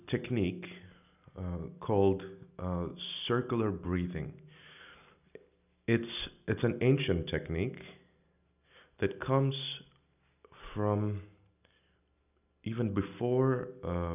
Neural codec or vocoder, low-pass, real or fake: none; 3.6 kHz; real